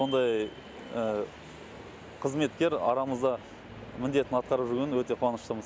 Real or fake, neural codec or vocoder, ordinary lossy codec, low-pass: real; none; none; none